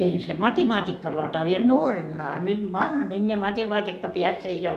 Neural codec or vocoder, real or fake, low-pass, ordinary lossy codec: codec, 32 kHz, 1.9 kbps, SNAC; fake; 14.4 kHz; MP3, 96 kbps